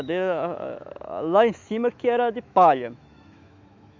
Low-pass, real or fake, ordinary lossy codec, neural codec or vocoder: 7.2 kHz; fake; MP3, 64 kbps; autoencoder, 48 kHz, 128 numbers a frame, DAC-VAE, trained on Japanese speech